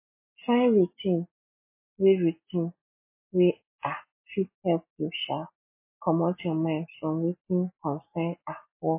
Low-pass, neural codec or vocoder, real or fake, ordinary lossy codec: 3.6 kHz; none; real; MP3, 24 kbps